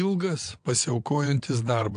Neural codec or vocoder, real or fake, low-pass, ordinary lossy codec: vocoder, 22.05 kHz, 80 mel bands, WaveNeXt; fake; 9.9 kHz; AAC, 64 kbps